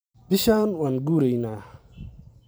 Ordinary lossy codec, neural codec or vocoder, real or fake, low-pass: none; vocoder, 44.1 kHz, 128 mel bands every 512 samples, BigVGAN v2; fake; none